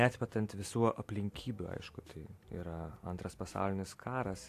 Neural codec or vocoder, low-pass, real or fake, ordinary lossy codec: none; 14.4 kHz; real; AAC, 64 kbps